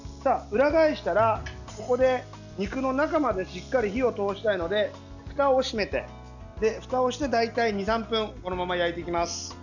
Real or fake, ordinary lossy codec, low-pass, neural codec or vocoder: real; none; 7.2 kHz; none